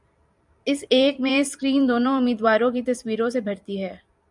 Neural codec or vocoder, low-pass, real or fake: vocoder, 44.1 kHz, 128 mel bands every 256 samples, BigVGAN v2; 10.8 kHz; fake